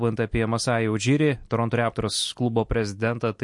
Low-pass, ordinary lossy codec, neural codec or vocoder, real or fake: 10.8 kHz; MP3, 48 kbps; none; real